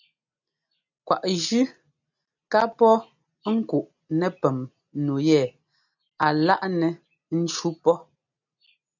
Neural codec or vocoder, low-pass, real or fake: none; 7.2 kHz; real